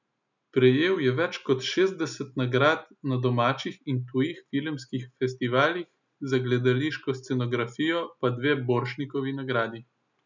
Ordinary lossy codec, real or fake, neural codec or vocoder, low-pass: none; real; none; 7.2 kHz